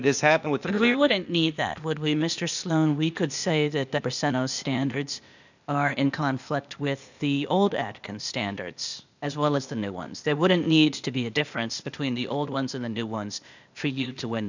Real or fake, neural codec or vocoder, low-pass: fake; codec, 16 kHz, 0.8 kbps, ZipCodec; 7.2 kHz